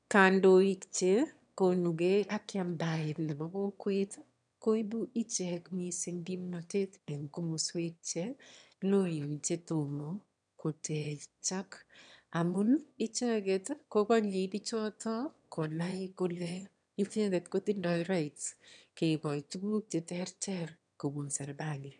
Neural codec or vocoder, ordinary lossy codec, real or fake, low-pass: autoencoder, 22.05 kHz, a latent of 192 numbers a frame, VITS, trained on one speaker; none; fake; 9.9 kHz